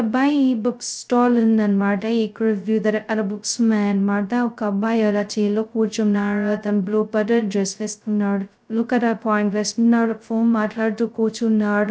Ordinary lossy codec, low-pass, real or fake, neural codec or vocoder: none; none; fake; codec, 16 kHz, 0.2 kbps, FocalCodec